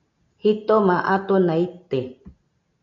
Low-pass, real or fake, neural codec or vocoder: 7.2 kHz; real; none